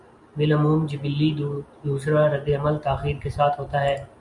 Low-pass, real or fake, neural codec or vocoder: 10.8 kHz; real; none